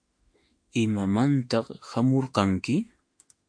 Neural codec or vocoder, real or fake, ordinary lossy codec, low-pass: autoencoder, 48 kHz, 32 numbers a frame, DAC-VAE, trained on Japanese speech; fake; MP3, 48 kbps; 9.9 kHz